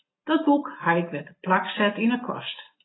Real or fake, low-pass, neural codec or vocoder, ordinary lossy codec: real; 7.2 kHz; none; AAC, 16 kbps